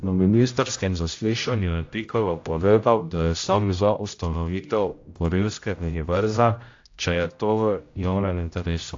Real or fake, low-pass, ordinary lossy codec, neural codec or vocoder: fake; 7.2 kHz; AAC, 48 kbps; codec, 16 kHz, 0.5 kbps, X-Codec, HuBERT features, trained on general audio